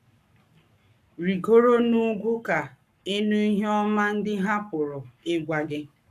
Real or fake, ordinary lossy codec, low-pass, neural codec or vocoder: fake; none; 14.4 kHz; codec, 44.1 kHz, 7.8 kbps, Pupu-Codec